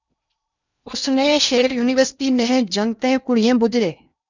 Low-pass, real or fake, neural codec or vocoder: 7.2 kHz; fake; codec, 16 kHz in and 24 kHz out, 0.8 kbps, FocalCodec, streaming, 65536 codes